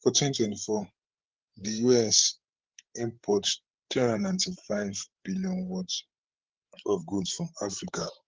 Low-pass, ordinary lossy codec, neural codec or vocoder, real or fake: 7.2 kHz; Opus, 16 kbps; codec, 16 kHz, 8 kbps, FreqCodec, larger model; fake